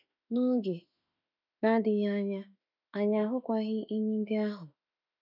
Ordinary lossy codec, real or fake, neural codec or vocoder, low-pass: none; fake; autoencoder, 48 kHz, 32 numbers a frame, DAC-VAE, trained on Japanese speech; 5.4 kHz